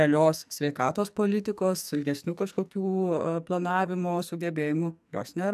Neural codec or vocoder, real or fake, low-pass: codec, 44.1 kHz, 2.6 kbps, SNAC; fake; 14.4 kHz